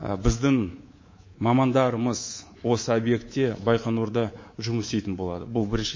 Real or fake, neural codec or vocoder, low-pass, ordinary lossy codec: fake; codec, 24 kHz, 3.1 kbps, DualCodec; 7.2 kHz; MP3, 32 kbps